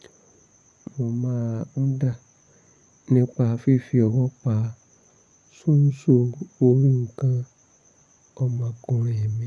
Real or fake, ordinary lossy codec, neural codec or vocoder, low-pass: fake; none; vocoder, 24 kHz, 100 mel bands, Vocos; none